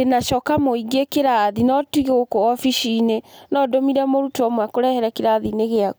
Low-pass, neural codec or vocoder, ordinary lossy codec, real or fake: none; none; none; real